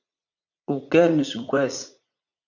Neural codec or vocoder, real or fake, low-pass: vocoder, 44.1 kHz, 80 mel bands, Vocos; fake; 7.2 kHz